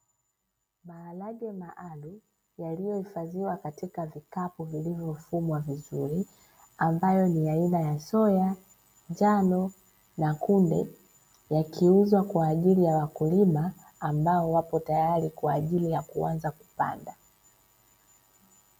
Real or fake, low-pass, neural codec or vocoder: real; 19.8 kHz; none